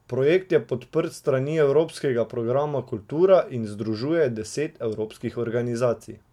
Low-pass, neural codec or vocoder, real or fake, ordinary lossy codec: 19.8 kHz; none; real; MP3, 96 kbps